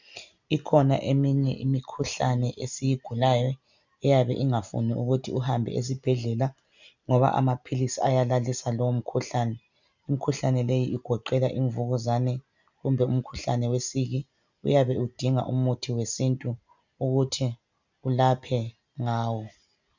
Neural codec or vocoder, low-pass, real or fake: none; 7.2 kHz; real